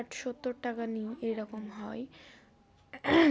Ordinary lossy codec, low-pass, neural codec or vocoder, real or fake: none; none; none; real